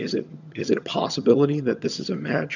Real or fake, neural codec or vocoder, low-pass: fake; vocoder, 22.05 kHz, 80 mel bands, HiFi-GAN; 7.2 kHz